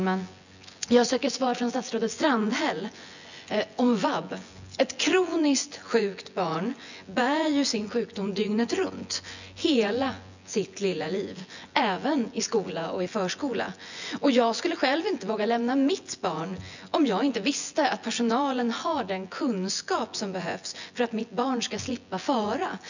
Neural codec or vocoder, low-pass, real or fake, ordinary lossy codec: vocoder, 24 kHz, 100 mel bands, Vocos; 7.2 kHz; fake; none